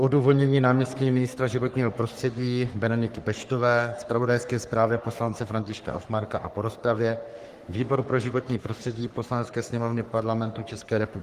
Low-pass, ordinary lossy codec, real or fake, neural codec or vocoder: 14.4 kHz; Opus, 24 kbps; fake; codec, 44.1 kHz, 3.4 kbps, Pupu-Codec